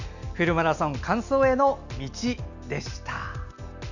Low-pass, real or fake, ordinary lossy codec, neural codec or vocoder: 7.2 kHz; real; none; none